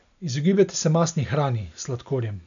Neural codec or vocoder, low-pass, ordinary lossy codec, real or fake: none; 7.2 kHz; none; real